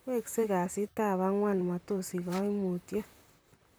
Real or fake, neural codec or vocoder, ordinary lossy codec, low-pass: fake; vocoder, 44.1 kHz, 128 mel bands, Pupu-Vocoder; none; none